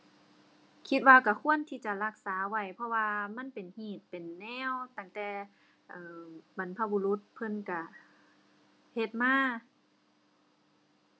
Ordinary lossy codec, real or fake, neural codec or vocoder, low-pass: none; real; none; none